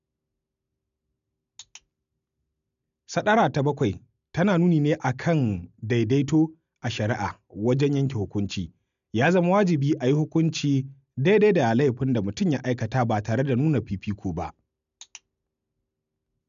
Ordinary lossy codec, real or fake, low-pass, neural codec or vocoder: none; real; 7.2 kHz; none